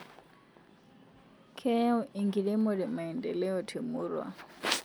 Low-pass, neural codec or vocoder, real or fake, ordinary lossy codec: none; none; real; none